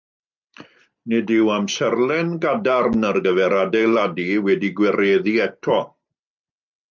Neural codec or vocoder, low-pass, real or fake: none; 7.2 kHz; real